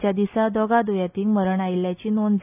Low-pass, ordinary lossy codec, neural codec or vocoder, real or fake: 3.6 kHz; none; none; real